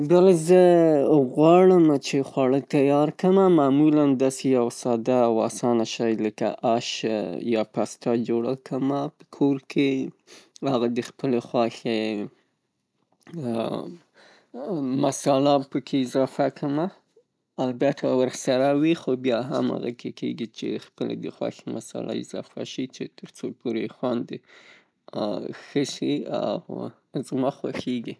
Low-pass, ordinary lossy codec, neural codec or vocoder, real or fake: none; none; none; real